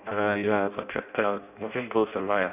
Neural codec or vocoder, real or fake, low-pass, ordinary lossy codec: codec, 16 kHz in and 24 kHz out, 0.6 kbps, FireRedTTS-2 codec; fake; 3.6 kHz; none